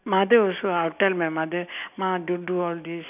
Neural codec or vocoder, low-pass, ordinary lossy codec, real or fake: none; 3.6 kHz; none; real